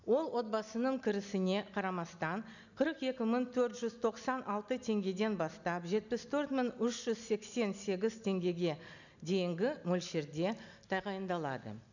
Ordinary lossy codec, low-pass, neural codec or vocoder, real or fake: none; 7.2 kHz; none; real